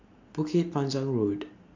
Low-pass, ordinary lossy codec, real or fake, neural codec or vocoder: 7.2 kHz; MP3, 48 kbps; real; none